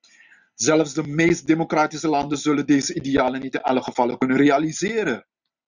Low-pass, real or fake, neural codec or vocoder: 7.2 kHz; real; none